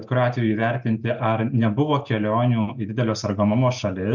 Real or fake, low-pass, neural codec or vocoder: real; 7.2 kHz; none